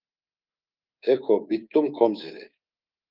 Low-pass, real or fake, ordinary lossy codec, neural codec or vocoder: 5.4 kHz; fake; Opus, 24 kbps; codec, 24 kHz, 3.1 kbps, DualCodec